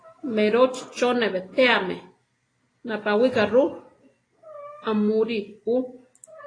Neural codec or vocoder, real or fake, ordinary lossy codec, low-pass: none; real; AAC, 32 kbps; 9.9 kHz